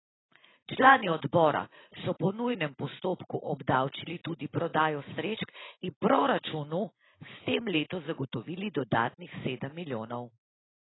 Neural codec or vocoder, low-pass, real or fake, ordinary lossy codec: none; 7.2 kHz; real; AAC, 16 kbps